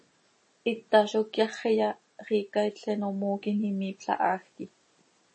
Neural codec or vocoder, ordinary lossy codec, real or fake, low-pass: none; MP3, 32 kbps; real; 9.9 kHz